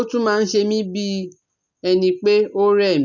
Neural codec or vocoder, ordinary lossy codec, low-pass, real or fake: none; none; 7.2 kHz; real